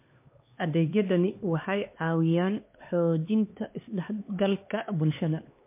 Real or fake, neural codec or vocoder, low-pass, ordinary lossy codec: fake; codec, 16 kHz, 2 kbps, X-Codec, HuBERT features, trained on LibriSpeech; 3.6 kHz; MP3, 24 kbps